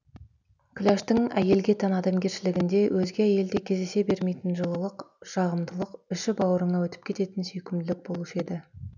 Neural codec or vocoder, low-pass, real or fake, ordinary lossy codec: none; 7.2 kHz; real; none